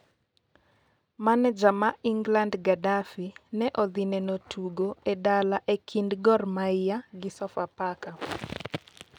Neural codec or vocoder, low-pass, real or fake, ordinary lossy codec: none; 19.8 kHz; real; none